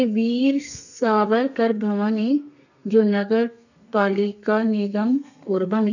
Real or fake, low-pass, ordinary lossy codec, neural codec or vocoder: fake; 7.2 kHz; none; codec, 32 kHz, 1.9 kbps, SNAC